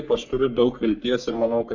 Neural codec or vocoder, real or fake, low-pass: codec, 44.1 kHz, 3.4 kbps, Pupu-Codec; fake; 7.2 kHz